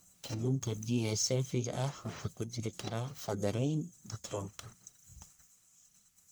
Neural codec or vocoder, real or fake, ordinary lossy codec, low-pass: codec, 44.1 kHz, 1.7 kbps, Pupu-Codec; fake; none; none